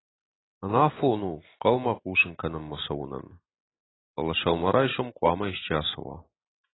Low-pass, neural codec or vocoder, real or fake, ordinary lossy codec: 7.2 kHz; none; real; AAC, 16 kbps